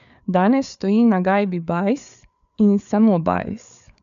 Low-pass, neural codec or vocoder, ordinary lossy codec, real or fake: 7.2 kHz; codec, 16 kHz, 4 kbps, FreqCodec, larger model; none; fake